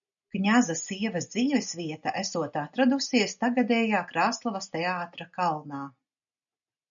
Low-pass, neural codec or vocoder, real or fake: 7.2 kHz; none; real